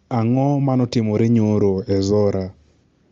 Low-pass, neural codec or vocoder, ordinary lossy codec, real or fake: 7.2 kHz; none; Opus, 32 kbps; real